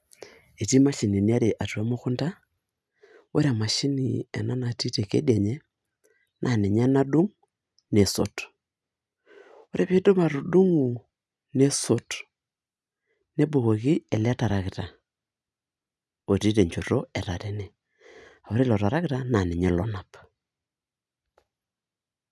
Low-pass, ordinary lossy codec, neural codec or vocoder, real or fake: none; none; none; real